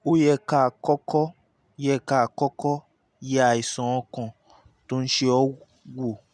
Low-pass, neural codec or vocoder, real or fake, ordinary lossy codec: none; none; real; none